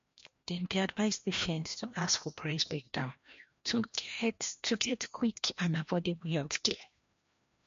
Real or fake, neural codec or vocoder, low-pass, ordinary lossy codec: fake; codec, 16 kHz, 1 kbps, FreqCodec, larger model; 7.2 kHz; MP3, 48 kbps